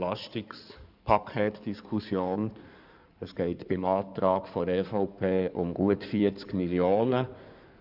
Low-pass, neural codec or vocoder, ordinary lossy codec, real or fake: 5.4 kHz; codec, 16 kHz in and 24 kHz out, 2.2 kbps, FireRedTTS-2 codec; none; fake